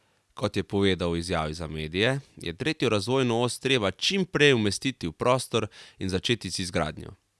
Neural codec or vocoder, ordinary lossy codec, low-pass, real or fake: none; none; none; real